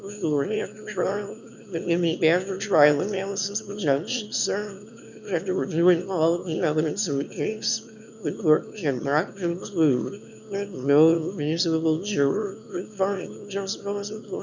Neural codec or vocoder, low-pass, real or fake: autoencoder, 22.05 kHz, a latent of 192 numbers a frame, VITS, trained on one speaker; 7.2 kHz; fake